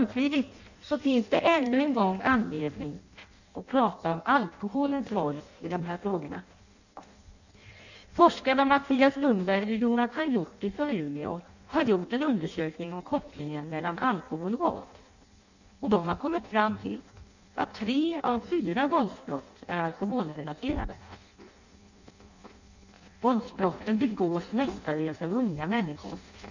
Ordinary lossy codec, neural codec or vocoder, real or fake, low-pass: none; codec, 16 kHz in and 24 kHz out, 0.6 kbps, FireRedTTS-2 codec; fake; 7.2 kHz